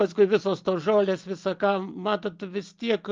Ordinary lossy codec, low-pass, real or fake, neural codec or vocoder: Opus, 32 kbps; 7.2 kHz; real; none